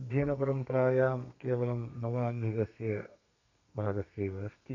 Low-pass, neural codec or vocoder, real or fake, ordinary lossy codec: 7.2 kHz; codec, 32 kHz, 1.9 kbps, SNAC; fake; none